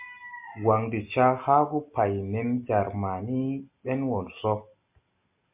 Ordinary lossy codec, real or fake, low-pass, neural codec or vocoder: MP3, 32 kbps; real; 3.6 kHz; none